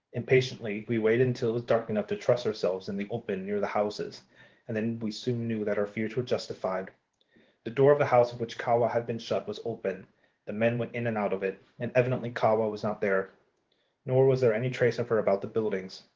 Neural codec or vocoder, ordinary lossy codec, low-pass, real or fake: codec, 16 kHz in and 24 kHz out, 1 kbps, XY-Tokenizer; Opus, 16 kbps; 7.2 kHz; fake